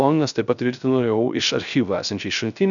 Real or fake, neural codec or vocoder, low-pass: fake; codec, 16 kHz, 0.3 kbps, FocalCodec; 7.2 kHz